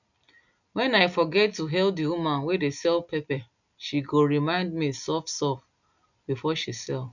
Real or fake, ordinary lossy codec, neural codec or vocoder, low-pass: real; none; none; 7.2 kHz